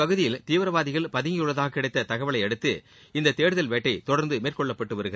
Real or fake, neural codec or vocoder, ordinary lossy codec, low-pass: real; none; none; none